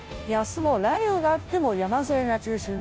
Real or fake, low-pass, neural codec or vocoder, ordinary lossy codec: fake; none; codec, 16 kHz, 0.5 kbps, FunCodec, trained on Chinese and English, 25 frames a second; none